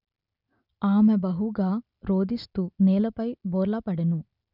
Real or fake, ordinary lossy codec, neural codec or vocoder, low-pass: real; none; none; 5.4 kHz